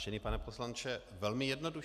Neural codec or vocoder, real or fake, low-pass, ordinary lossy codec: none; real; 14.4 kHz; AAC, 96 kbps